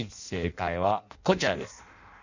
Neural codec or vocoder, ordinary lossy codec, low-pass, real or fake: codec, 16 kHz in and 24 kHz out, 0.6 kbps, FireRedTTS-2 codec; none; 7.2 kHz; fake